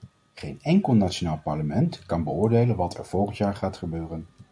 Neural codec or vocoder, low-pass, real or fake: none; 9.9 kHz; real